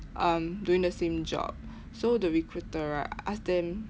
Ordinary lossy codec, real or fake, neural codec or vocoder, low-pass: none; real; none; none